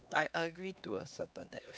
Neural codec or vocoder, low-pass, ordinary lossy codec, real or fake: codec, 16 kHz, 2 kbps, X-Codec, HuBERT features, trained on LibriSpeech; none; none; fake